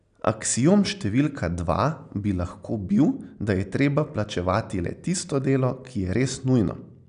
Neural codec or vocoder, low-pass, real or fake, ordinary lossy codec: none; 9.9 kHz; real; none